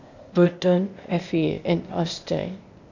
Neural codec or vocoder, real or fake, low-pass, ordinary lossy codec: codec, 16 kHz, 0.8 kbps, ZipCodec; fake; 7.2 kHz; none